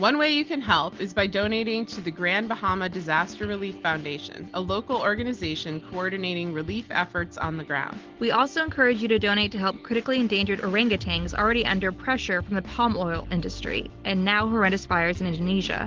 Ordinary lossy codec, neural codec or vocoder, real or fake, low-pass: Opus, 16 kbps; none; real; 7.2 kHz